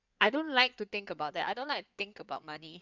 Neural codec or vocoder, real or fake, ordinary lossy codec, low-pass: codec, 16 kHz in and 24 kHz out, 2.2 kbps, FireRedTTS-2 codec; fake; none; 7.2 kHz